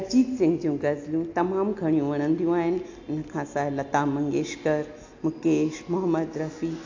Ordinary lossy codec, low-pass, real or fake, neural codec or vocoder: AAC, 48 kbps; 7.2 kHz; real; none